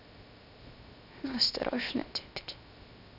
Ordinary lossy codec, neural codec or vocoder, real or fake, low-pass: none; codec, 16 kHz, 0.7 kbps, FocalCodec; fake; 5.4 kHz